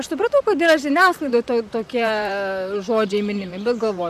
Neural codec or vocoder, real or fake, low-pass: vocoder, 44.1 kHz, 128 mel bands, Pupu-Vocoder; fake; 14.4 kHz